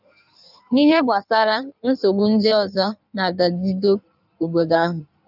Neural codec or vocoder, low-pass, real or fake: codec, 16 kHz in and 24 kHz out, 1.1 kbps, FireRedTTS-2 codec; 5.4 kHz; fake